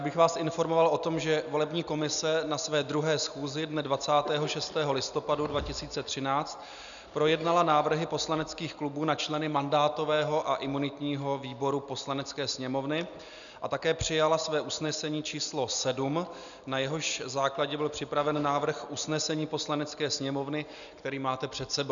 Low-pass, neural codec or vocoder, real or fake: 7.2 kHz; none; real